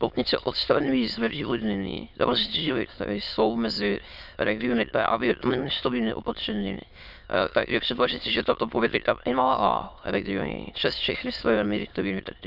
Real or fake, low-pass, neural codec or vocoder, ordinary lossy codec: fake; 5.4 kHz; autoencoder, 22.05 kHz, a latent of 192 numbers a frame, VITS, trained on many speakers; Opus, 64 kbps